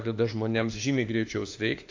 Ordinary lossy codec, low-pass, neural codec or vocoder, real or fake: AAC, 48 kbps; 7.2 kHz; autoencoder, 48 kHz, 32 numbers a frame, DAC-VAE, trained on Japanese speech; fake